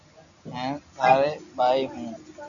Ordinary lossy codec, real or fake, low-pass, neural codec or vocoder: MP3, 96 kbps; real; 7.2 kHz; none